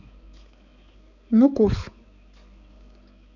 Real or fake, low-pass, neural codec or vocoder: fake; 7.2 kHz; codec, 16 kHz, 4 kbps, X-Codec, HuBERT features, trained on balanced general audio